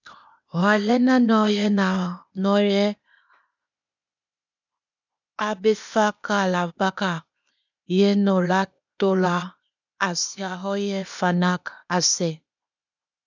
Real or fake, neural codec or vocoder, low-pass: fake; codec, 16 kHz, 0.8 kbps, ZipCodec; 7.2 kHz